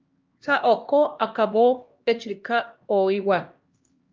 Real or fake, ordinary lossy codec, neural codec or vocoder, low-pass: fake; Opus, 24 kbps; codec, 16 kHz, 1 kbps, X-Codec, HuBERT features, trained on LibriSpeech; 7.2 kHz